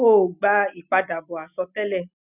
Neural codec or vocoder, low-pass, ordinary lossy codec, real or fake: none; 3.6 kHz; none; real